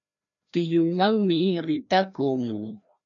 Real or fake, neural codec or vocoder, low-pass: fake; codec, 16 kHz, 1 kbps, FreqCodec, larger model; 7.2 kHz